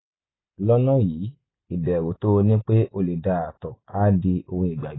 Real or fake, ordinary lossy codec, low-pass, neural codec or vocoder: real; AAC, 16 kbps; 7.2 kHz; none